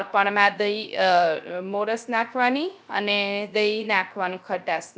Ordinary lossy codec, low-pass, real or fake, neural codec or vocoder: none; none; fake; codec, 16 kHz, 0.3 kbps, FocalCodec